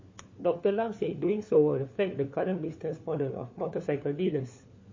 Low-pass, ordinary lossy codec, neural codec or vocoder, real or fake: 7.2 kHz; MP3, 32 kbps; codec, 16 kHz, 4 kbps, FunCodec, trained on LibriTTS, 50 frames a second; fake